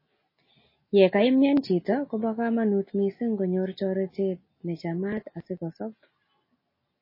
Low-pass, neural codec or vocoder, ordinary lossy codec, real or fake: 5.4 kHz; none; MP3, 24 kbps; real